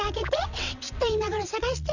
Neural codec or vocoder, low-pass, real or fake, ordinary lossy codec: vocoder, 22.05 kHz, 80 mel bands, WaveNeXt; 7.2 kHz; fake; none